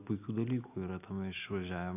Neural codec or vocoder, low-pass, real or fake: none; 3.6 kHz; real